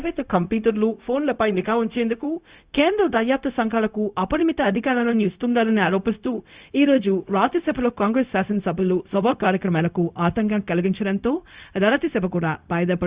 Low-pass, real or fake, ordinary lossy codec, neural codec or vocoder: 3.6 kHz; fake; Opus, 64 kbps; codec, 16 kHz, 0.4 kbps, LongCat-Audio-Codec